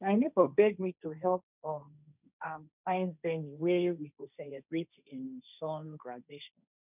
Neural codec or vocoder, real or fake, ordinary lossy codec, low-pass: codec, 16 kHz, 1.1 kbps, Voila-Tokenizer; fake; none; 3.6 kHz